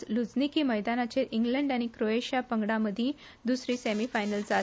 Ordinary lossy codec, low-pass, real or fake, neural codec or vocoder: none; none; real; none